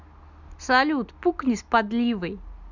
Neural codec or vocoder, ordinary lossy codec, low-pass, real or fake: none; none; 7.2 kHz; real